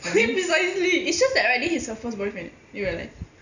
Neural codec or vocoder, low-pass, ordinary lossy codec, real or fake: none; 7.2 kHz; none; real